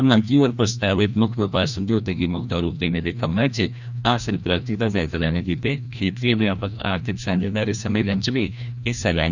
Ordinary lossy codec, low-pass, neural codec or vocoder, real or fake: none; 7.2 kHz; codec, 16 kHz, 1 kbps, FreqCodec, larger model; fake